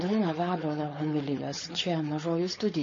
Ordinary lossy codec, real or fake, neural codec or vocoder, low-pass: MP3, 32 kbps; fake; codec, 16 kHz, 4.8 kbps, FACodec; 7.2 kHz